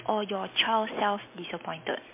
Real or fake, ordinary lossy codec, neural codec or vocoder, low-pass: real; MP3, 24 kbps; none; 3.6 kHz